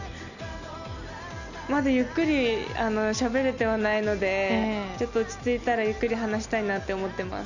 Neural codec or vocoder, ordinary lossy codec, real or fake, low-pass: none; none; real; 7.2 kHz